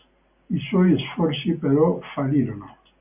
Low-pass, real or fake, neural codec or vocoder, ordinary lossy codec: 3.6 kHz; real; none; AAC, 32 kbps